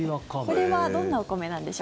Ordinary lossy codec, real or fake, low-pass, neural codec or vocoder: none; real; none; none